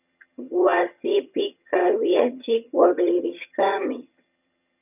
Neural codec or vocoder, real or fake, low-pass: vocoder, 22.05 kHz, 80 mel bands, HiFi-GAN; fake; 3.6 kHz